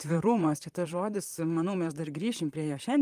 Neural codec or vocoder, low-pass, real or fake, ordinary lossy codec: vocoder, 44.1 kHz, 128 mel bands, Pupu-Vocoder; 14.4 kHz; fake; Opus, 32 kbps